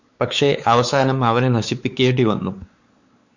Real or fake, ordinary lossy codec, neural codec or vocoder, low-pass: fake; Opus, 64 kbps; codec, 16 kHz, 4 kbps, X-Codec, WavLM features, trained on Multilingual LibriSpeech; 7.2 kHz